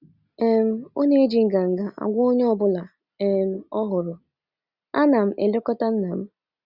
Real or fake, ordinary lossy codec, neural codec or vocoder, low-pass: real; Opus, 64 kbps; none; 5.4 kHz